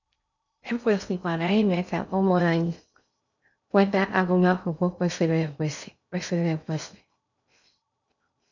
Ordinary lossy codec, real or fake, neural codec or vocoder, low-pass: none; fake; codec, 16 kHz in and 24 kHz out, 0.6 kbps, FocalCodec, streaming, 2048 codes; 7.2 kHz